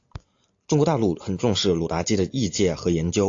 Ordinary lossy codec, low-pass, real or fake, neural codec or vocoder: AAC, 48 kbps; 7.2 kHz; real; none